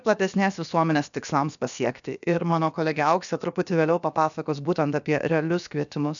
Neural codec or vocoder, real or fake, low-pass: codec, 16 kHz, about 1 kbps, DyCAST, with the encoder's durations; fake; 7.2 kHz